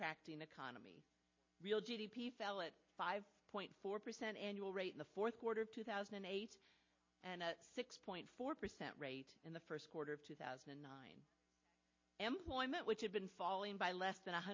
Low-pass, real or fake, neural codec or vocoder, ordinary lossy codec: 7.2 kHz; real; none; MP3, 32 kbps